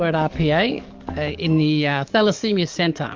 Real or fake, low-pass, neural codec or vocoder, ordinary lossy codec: fake; 7.2 kHz; codec, 44.1 kHz, 7.8 kbps, DAC; Opus, 32 kbps